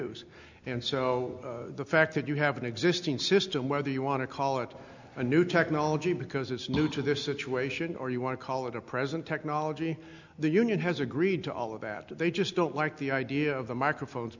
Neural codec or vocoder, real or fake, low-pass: none; real; 7.2 kHz